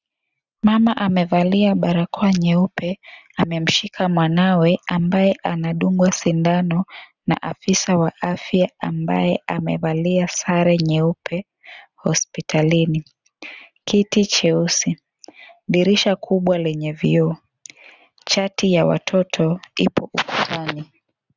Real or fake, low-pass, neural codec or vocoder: real; 7.2 kHz; none